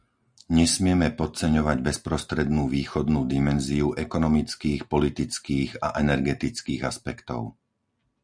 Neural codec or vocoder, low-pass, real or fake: none; 9.9 kHz; real